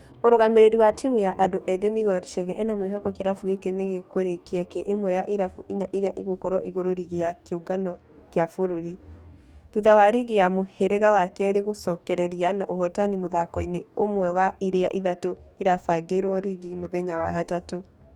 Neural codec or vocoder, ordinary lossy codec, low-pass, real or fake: codec, 44.1 kHz, 2.6 kbps, DAC; Opus, 64 kbps; 19.8 kHz; fake